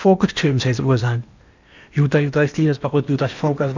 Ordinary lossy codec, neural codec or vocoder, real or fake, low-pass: none; codec, 16 kHz in and 24 kHz out, 0.8 kbps, FocalCodec, streaming, 65536 codes; fake; 7.2 kHz